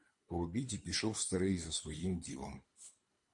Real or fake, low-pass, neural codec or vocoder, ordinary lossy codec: fake; 10.8 kHz; codec, 24 kHz, 3 kbps, HILCodec; MP3, 48 kbps